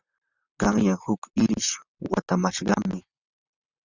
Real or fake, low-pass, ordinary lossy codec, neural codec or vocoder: fake; 7.2 kHz; Opus, 64 kbps; vocoder, 44.1 kHz, 128 mel bands, Pupu-Vocoder